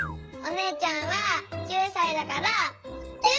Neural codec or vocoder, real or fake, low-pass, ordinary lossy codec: codec, 16 kHz, 16 kbps, FreqCodec, smaller model; fake; none; none